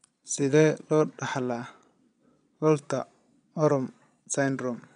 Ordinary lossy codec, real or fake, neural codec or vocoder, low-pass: none; fake; vocoder, 22.05 kHz, 80 mel bands, Vocos; 9.9 kHz